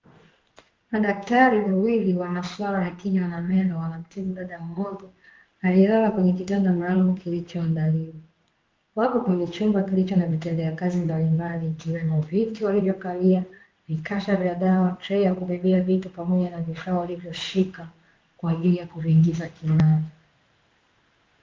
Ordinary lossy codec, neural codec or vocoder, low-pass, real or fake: Opus, 24 kbps; codec, 16 kHz in and 24 kHz out, 1 kbps, XY-Tokenizer; 7.2 kHz; fake